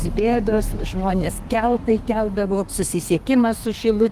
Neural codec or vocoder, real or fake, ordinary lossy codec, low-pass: codec, 32 kHz, 1.9 kbps, SNAC; fake; Opus, 24 kbps; 14.4 kHz